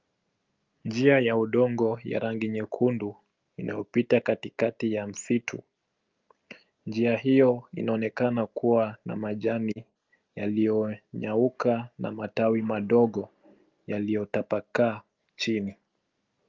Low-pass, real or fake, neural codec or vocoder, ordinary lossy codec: 7.2 kHz; real; none; Opus, 24 kbps